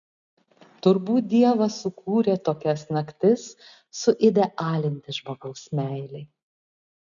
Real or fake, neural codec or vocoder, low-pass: real; none; 7.2 kHz